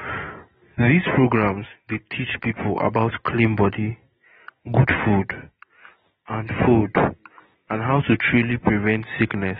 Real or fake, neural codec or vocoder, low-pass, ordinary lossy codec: real; none; 19.8 kHz; AAC, 16 kbps